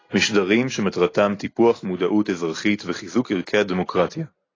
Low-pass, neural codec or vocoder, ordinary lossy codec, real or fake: 7.2 kHz; none; AAC, 32 kbps; real